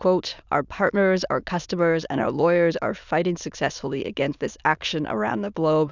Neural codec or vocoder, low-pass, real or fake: autoencoder, 22.05 kHz, a latent of 192 numbers a frame, VITS, trained on many speakers; 7.2 kHz; fake